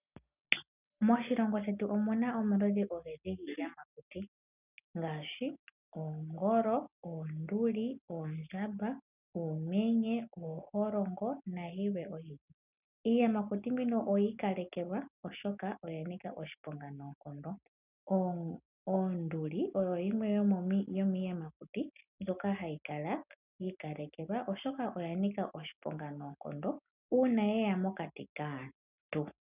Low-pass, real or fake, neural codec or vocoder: 3.6 kHz; real; none